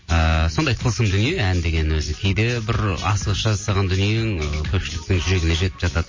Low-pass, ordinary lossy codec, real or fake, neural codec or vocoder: 7.2 kHz; MP3, 32 kbps; real; none